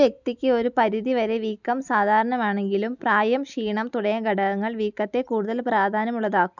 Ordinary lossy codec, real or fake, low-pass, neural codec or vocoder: none; real; 7.2 kHz; none